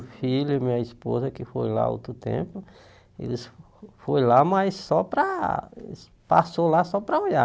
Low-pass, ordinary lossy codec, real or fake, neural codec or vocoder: none; none; real; none